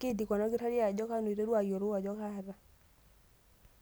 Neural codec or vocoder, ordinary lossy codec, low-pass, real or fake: none; none; none; real